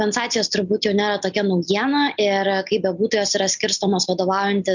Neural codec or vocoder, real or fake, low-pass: none; real; 7.2 kHz